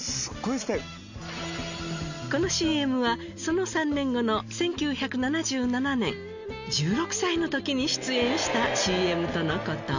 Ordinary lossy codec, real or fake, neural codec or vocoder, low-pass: none; real; none; 7.2 kHz